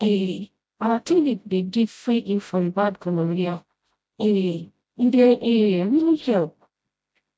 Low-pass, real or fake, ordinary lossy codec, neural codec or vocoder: none; fake; none; codec, 16 kHz, 0.5 kbps, FreqCodec, smaller model